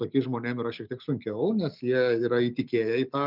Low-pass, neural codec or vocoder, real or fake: 5.4 kHz; none; real